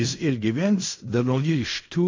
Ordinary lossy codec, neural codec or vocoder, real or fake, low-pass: MP3, 48 kbps; codec, 16 kHz in and 24 kHz out, 0.4 kbps, LongCat-Audio-Codec, fine tuned four codebook decoder; fake; 7.2 kHz